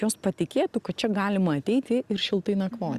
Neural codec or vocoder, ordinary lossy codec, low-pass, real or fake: none; Opus, 64 kbps; 14.4 kHz; real